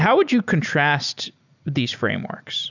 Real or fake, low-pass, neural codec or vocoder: real; 7.2 kHz; none